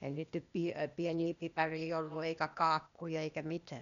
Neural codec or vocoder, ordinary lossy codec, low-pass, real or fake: codec, 16 kHz, 0.8 kbps, ZipCodec; none; 7.2 kHz; fake